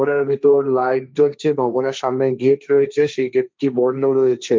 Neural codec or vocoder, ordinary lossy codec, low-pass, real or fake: codec, 16 kHz, 1.1 kbps, Voila-Tokenizer; none; none; fake